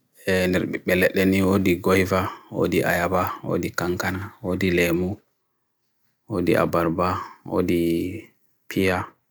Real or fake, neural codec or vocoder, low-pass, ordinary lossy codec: real; none; none; none